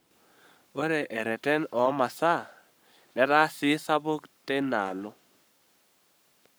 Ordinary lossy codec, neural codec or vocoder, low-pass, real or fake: none; codec, 44.1 kHz, 7.8 kbps, Pupu-Codec; none; fake